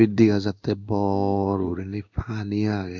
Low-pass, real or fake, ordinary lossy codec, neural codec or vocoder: 7.2 kHz; fake; none; codec, 16 kHz in and 24 kHz out, 1 kbps, XY-Tokenizer